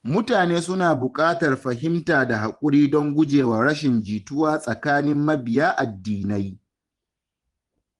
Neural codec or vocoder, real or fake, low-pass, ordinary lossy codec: vocoder, 24 kHz, 100 mel bands, Vocos; fake; 10.8 kHz; Opus, 24 kbps